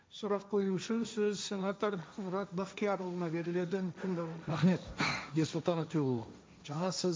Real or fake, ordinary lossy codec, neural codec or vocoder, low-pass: fake; none; codec, 16 kHz, 1.1 kbps, Voila-Tokenizer; none